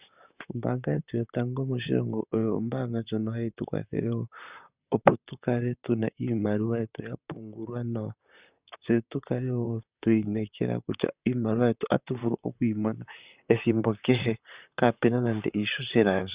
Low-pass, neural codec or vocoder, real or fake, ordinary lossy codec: 3.6 kHz; vocoder, 44.1 kHz, 128 mel bands, Pupu-Vocoder; fake; Opus, 64 kbps